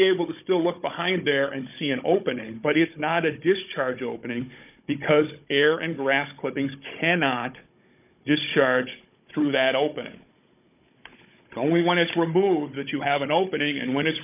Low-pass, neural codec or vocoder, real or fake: 3.6 kHz; codec, 16 kHz, 16 kbps, FunCodec, trained on Chinese and English, 50 frames a second; fake